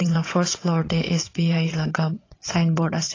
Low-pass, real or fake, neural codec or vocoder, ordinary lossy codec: 7.2 kHz; fake; vocoder, 22.05 kHz, 80 mel bands, WaveNeXt; AAC, 32 kbps